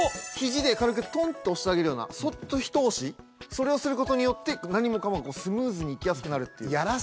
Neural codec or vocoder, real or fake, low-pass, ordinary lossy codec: none; real; none; none